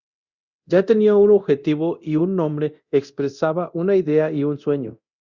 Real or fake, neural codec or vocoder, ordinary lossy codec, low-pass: fake; codec, 24 kHz, 0.9 kbps, DualCodec; Opus, 64 kbps; 7.2 kHz